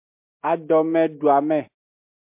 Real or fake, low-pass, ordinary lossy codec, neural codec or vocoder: real; 3.6 kHz; MP3, 32 kbps; none